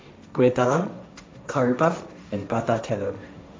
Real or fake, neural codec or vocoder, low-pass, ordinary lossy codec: fake; codec, 16 kHz, 1.1 kbps, Voila-Tokenizer; none; none